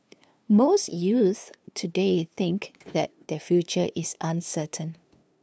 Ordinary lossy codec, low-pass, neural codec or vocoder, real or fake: none; none; codec, 16 kHz, 2 kbps, FunCodec, trained on LibriTTS, 25 frames a second; fake